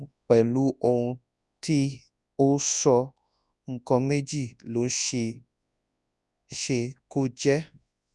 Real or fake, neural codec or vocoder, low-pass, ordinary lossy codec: fake; codec, 24 kHz, 0.9 kbps, WavTokenizer, large speech release; 10.8 kHz; none